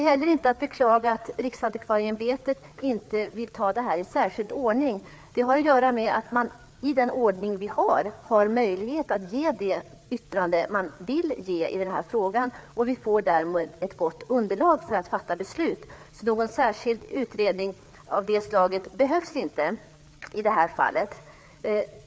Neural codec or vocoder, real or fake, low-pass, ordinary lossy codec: codec, 16 kHz, 4 kbps, FreqCodec, larger model; fake; none; none